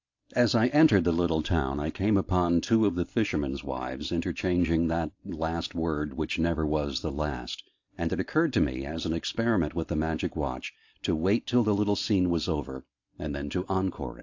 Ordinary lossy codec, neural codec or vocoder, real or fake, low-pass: AAC, 48 kbps; none; real; 7.2 kHz